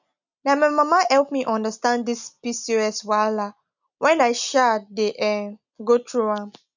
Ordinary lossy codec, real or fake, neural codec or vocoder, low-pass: none; real; none; 7.2 kHz